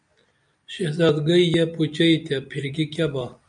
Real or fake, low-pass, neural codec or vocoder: real; 9.9 kHz; none